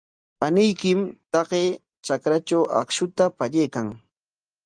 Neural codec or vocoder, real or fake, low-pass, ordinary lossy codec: none; real; 9.9 kHz; Opus, 32 kbps